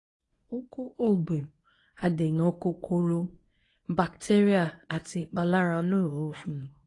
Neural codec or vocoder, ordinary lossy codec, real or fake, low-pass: codec, 24 kHz, 0.9 kbps, WavTokenizer, medium speech release version 1; AAC, 32 kbps; fake; 10.8 kHz